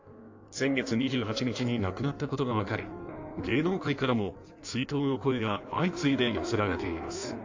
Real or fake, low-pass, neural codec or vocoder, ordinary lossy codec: fake; 7.2 kHz; codec, 16 kHz in and 24 kHz out, 1.1 kbps, FireRedTTS-2 codec; AAC, 48 kbps